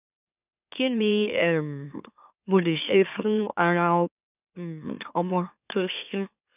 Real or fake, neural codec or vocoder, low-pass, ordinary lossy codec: fake; autoencoder, 44.1 kHz, a latent of 192 numbers a frame, MeloTTS; 3.6 kHz; none